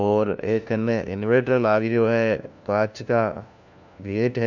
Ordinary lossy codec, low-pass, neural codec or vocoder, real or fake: none; 7.2 kHz; codec, 16 kHz, 1 kbps, FunCodec, trained on LibriTTS, 50 frames a second; fake